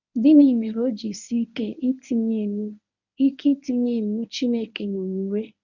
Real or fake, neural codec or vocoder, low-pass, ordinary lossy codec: fake; codec, 24 kHz, 0.9 kbps, WavTokenizer, medium speech release version 1; 7.2 kHz; none